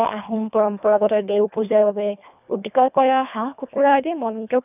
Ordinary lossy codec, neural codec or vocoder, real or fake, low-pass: none; codec, 24 kHz, 1.5 kbps, HILCodec; fake; 3.6 kHz